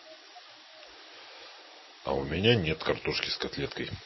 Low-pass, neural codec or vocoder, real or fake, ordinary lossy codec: 7.2 kHz; none; real; MP3, 24 kbps